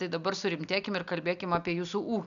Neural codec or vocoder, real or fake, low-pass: none; real; 7.2 kHz